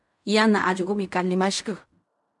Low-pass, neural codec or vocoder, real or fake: 10.8 kHz; codec, 16 kHz in and 24 kHz out, 0.4 kbps, LongCat-Audio-Codec, fine tuned four codebook decoder; fake